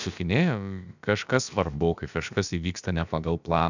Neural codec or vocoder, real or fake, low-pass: codec, 16 kHz, about 1 kbps, DyCAST, with the encoder's durations; fake; 7.2 kHz